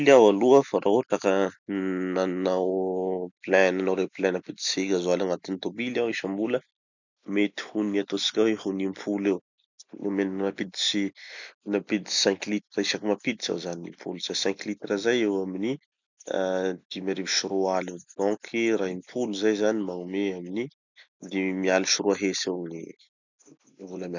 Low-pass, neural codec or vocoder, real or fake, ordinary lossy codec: 7.2 kHz; none; real; none